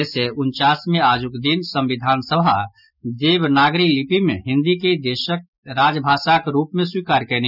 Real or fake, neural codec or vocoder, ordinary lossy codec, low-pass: real; none; none; 5.4 kHz